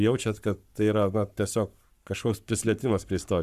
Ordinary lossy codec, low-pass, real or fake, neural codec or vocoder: Opus, 64 kbps; 14.4 kHz; fake; codec, 44.1 kHz, 7.8 kbps, Pupu-Codec